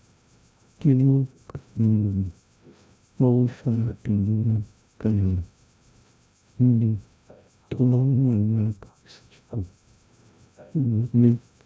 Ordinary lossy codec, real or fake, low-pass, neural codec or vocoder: none; fake; none; codec, 16 kHz, 0.5 kbps, FreqCodec, larger model